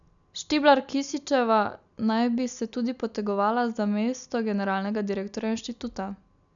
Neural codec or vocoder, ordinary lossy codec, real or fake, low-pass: none; none; real; 7.2 kHz